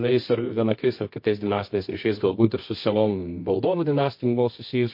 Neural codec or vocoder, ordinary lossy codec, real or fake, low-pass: codec, 24 kHz, 0.9 kbps, WavTokenizer, medium music audio release; MP3, 32 kbps; fake; 5.4 kHz